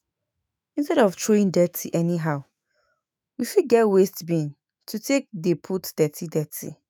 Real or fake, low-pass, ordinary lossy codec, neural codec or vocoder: fake; none; none; autoencoder, 48 kHz, 128 numbers a frame, DAC-VAE, trained on Japanese speech